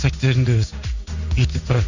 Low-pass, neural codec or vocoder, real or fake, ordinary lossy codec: 7.2 kHz; codec, 16 kHz in and 24 kHz out, 1 kbps, XY-Tokenizer; fake; none